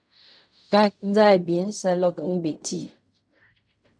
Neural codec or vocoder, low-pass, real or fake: codec, 16 kHz in and 24 kHz out, 0.4 kbps, LongCat-Audio-Codec, fine tuned four codebook decoder; 9.9 kHz; fake